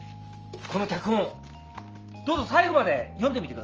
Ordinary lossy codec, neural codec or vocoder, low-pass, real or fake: Opus, 24 kbps; none; 7.2 kHz; real